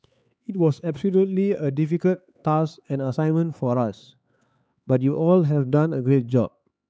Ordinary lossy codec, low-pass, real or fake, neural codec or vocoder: none; none; fake; codec, 16 kHz, 4 kbps, X-Codec, HuBERT features, trained on LibriSpeech